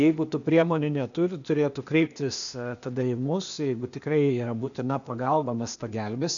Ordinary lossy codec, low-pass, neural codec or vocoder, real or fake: MP3, 96 kbps; 7.2 kHz; codec, 16 kHz, 0.8 kbps, ZipCodec; fake